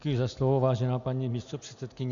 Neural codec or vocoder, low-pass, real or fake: none; 7.2 kHz; real